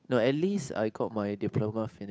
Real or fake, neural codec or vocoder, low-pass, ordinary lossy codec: fake; codec, 16 kHz, 8 kbps, FunCodec, trained on Chinese and English, 25 frames a second; none; none